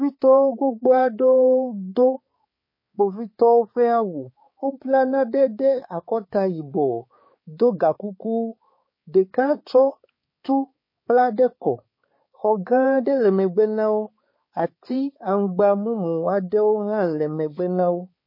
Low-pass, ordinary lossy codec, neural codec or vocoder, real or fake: 5.4 kHz; MP3, 24 kbps; codec, 16 kHz, 4 kbps, X-Codec, HuBERT features, trained on balanced general audio; fake